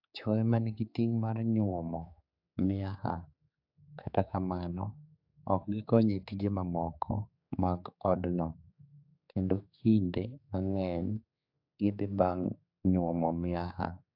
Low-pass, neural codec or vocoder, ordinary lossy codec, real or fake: 5.4 kHz; codec, 16 kHz, 4 kbps, X-Codec, HuBERT features, trained on general audio; AAC, 48 kbps; fake